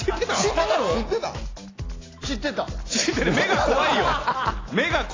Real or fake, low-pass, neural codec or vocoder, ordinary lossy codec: real; 7.2 kHz; none; AAC, 32 kbps